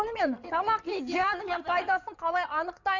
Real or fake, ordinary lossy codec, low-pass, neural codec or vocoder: fake; none; 7.2 kHz; codec, 16 kHz in and 24 kHz out, 2.2 kbps, FireRedTTS-2 codec